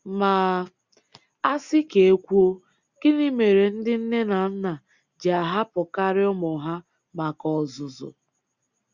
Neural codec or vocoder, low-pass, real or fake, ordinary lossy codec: none; 7.2 kHz; real; Opus, 64 kbps